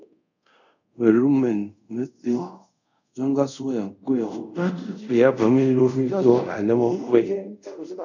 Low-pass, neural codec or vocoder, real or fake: 7.2 kHz; codec, 24 kHz, 0.5 kbps, DualCodec; fake